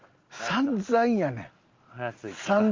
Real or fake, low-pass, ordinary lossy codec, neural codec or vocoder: real; 7.2 kHz; Opus, 32 kbps; none